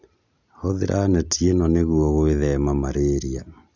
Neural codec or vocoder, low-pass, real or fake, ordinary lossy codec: none; 7.2 kHz; real; none